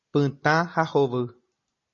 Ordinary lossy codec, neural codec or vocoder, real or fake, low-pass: MP3, 32 kbps; none; real; 7.2 kHz